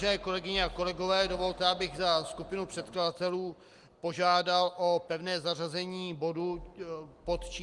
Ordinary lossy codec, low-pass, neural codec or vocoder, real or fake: Opus, 24 kbps; 10.8 kHz; none; real